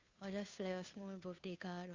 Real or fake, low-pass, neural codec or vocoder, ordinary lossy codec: fake; 7.2 kHz; codec, 16 kHz, 2 kbps, FunCodec, trained on Chinese and English, 25 frames a second; Opus, 64 kbps